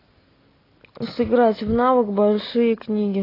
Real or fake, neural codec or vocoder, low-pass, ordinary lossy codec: real; none; 5.4 kHz; AAC, 24 kbps